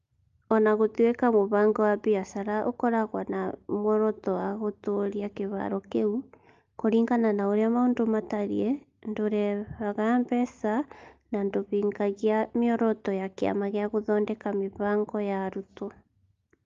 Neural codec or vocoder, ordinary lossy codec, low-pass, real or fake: none; Opus, 32 kbps; 7.2 kHz; real